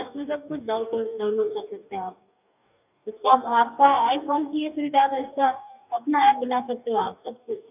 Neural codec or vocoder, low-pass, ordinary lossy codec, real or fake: codec, 44.1 kHz, 2.6 kbps, DAC; 3.6 kHz; none; fake